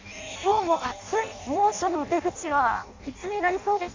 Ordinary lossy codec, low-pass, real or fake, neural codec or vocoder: MP3, 64 kbps; 7.2 kHz; fake; codec, 16 kHz in and 24 kHz out, 0.6 kbps, FireRedTTS-2 codec